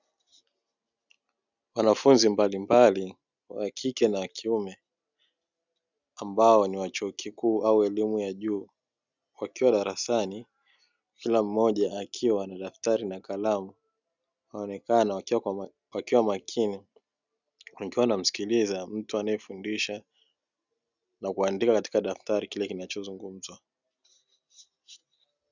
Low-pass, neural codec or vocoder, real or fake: 7.2 kHz; none; real